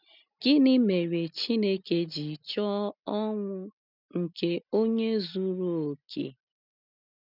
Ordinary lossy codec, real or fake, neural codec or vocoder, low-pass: none; real; none; 5.4 kHz